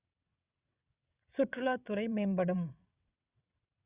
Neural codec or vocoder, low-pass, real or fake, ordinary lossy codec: vocoder, 22.05 kHz, 80 mel bands, WaveNeXt; 3.6 kHz; fake; Opus, 64 kbps